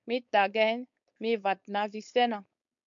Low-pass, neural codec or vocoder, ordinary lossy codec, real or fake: 7.2 kHz; codec, 16 kHz, 4.8 kbps, FACodec; MP3, 64 kbps; fake